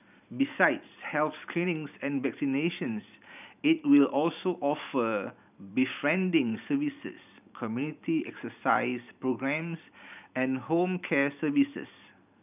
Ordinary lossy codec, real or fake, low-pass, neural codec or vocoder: none; fake; 3.6 kHz; vocoder, 44.1 kHz, 128 mel bands every 512 samples, BigVGAN v2